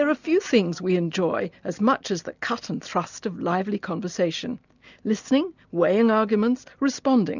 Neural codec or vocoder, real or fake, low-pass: none; real; 7.2 kHz